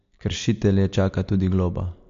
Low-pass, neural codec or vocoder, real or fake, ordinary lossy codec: 7.2 kHz; none; real; AAC, 48 kbps